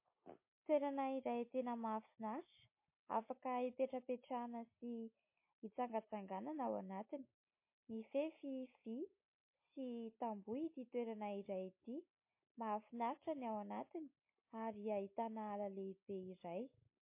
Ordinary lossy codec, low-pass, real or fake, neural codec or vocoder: MP3, 24 kbps; 3.6 kHz; real; none